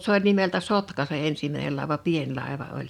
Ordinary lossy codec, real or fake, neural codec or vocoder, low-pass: none; fake; vocoder, 44.1 kHz, 128 mel bands every 512 samples, BigVGAN v2; 19.8 kHz